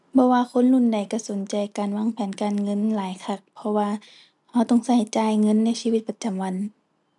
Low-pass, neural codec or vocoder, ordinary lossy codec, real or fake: 10.8 kHz; none; none; real